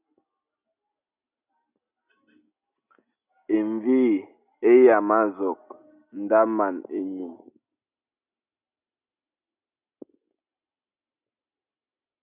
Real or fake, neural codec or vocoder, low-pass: real; none; 3.6 kHz